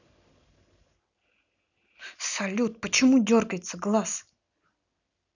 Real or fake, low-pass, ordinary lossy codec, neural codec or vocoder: real; 7.2 kHz; none; none